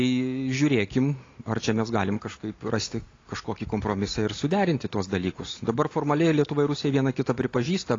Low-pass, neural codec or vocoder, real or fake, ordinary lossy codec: 7.2 kHz; none; real; AAC, 32 kbps